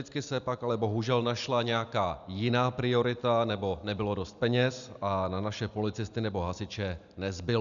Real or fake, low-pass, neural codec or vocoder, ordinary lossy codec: real; 7.2 kHz; none; MP3, 96 kbps